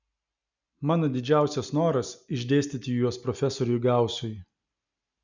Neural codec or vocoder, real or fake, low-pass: none; real; 7.2 kHz